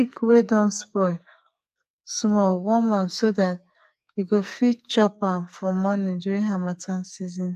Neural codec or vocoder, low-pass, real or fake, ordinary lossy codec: codec, 44.1 kHz, 2.6 kbps, SNAC; 14.4 kHz; fake; AAC, 96 kbps